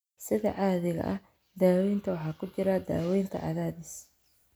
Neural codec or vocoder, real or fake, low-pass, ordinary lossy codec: none; real; none; none